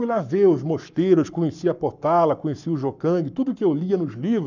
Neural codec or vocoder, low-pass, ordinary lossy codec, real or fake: codec, 44.1 kHz, 7.8 kbps, Pupu-Codec; 7.2 kHz; none; fake